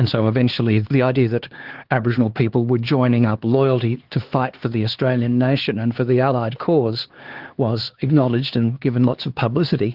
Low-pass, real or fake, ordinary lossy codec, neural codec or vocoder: 5.4 kHz; fake; Opus, 16 kbps; codec, 16 kHz, 4 kbps, X-Codec, HuBERT features, trained on LibriSpeech